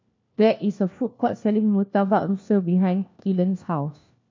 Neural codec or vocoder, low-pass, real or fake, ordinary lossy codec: codec, 16 kHz, 1 kbps, FunCodec, trained on LibriTTS, 50 frames a second; 7.2 kHz; fake; MP3, 64 kbps